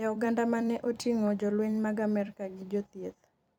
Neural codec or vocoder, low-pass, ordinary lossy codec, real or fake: vocoder, 44.1 kHz, 128 mel bands every 256 samples, BigVGAN v2; 19.8 kHz; none; fake